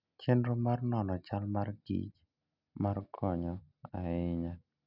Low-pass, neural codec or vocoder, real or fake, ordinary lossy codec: 5.4 kHz; none; real; none